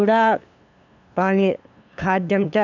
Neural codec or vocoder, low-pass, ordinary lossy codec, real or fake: codec, 16 kHz, 2 kbps, FunCodec, trained on LibriTTS, 25 frames a second; 7.2 kHz; none; fake